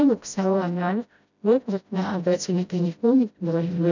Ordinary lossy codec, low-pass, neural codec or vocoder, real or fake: none; 7.2 kHz; codec, 16 kHz, 0.5 kbps, FreqCodec, smaller model; fake